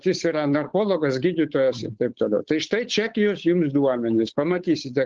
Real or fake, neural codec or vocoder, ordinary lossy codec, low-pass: fake; codec, 16 kHz, 8 kbps, FunCodec, trained on Chinese and English, 25 frames a second; Opus, 16 kbps; 7.2 kHz